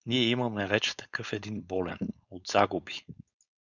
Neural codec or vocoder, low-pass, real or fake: codec, 16 kHz, 4.8 kbps, FACodec; 7.2 kHz; fake